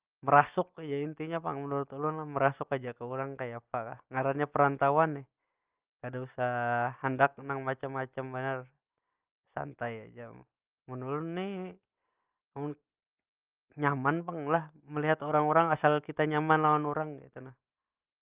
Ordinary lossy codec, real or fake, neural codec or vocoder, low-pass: Opus, 24 kbps; real; none; 3.6 kHz